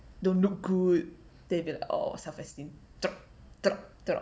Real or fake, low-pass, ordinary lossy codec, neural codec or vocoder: real; none; none; none